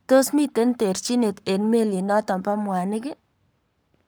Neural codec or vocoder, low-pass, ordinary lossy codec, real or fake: codec, 44.1 kHz, 7.8 kbps, Pupu-Codec; none; none; fake